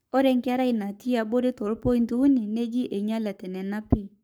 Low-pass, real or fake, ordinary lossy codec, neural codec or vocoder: none; fake; none; codec, 44.1 kHz, 7.8 kbps, Pupu-Codec